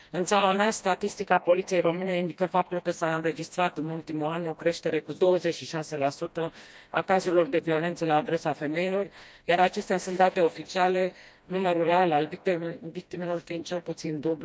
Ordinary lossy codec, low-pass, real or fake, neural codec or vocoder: none; none; fake; codec, 16 kHz, 1 kbps, FreqCodec, smaller model